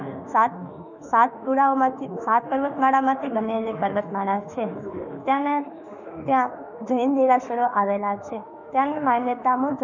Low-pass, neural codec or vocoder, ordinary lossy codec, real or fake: 7.2 kHz; autoencoder, 48 kHz, 32 numbers a frame, DAC-VAE, trained on Japanese speech; none; fake